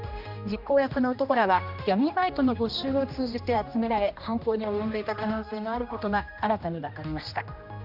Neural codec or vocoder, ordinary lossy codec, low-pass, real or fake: codec, 16 kHz, 1 kbps, X-Codec, HuBERT features, trained on general audio; none; 5.4 kHz; fake